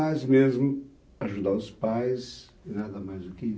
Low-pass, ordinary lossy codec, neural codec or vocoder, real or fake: none; none; none; real